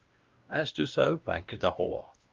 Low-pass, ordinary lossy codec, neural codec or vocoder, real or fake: 7.2 kHz; Opus, 32 kbps; codec, 16 kHz, 1 kbps, X-Codec, WavLM features, trained on Multilingual LibriSpeech; fake